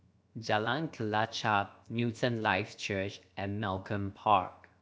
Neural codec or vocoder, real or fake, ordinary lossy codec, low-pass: codec, 16 kHz, 0.7 kbps, FocalCodec; fake; none; none